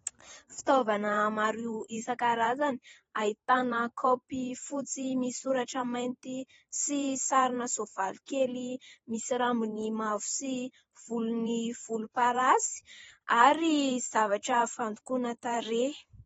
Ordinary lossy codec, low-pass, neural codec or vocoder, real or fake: AAC, 24 kbps; 19.8 kHz; vocoder, 44.1 kHz, 128 mel bands every 512 samples, BigVGAN v2; fake